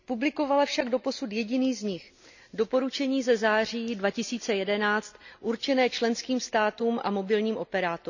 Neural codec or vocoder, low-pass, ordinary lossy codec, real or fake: none; 7.2 kHz; none; real